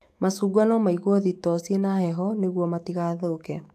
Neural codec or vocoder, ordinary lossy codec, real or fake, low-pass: autoencoder, 48 kHz, 128 numbers a frame, DAC-VAE, trained on Japanese speech; AAC, 64 kbps; fake; 14.4 kHz